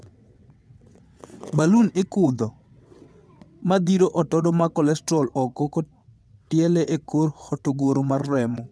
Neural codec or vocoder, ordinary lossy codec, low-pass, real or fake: vocoder, 22.05 kHz, 80 mel bands, WaveNeXt; none; none; fake